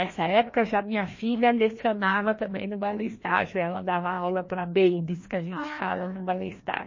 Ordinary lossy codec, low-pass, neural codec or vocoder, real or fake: MP3, 32 kbps; 7.2 kHz; codec, 16 kHz, 1 kbps, FreqCodec, larger model; fake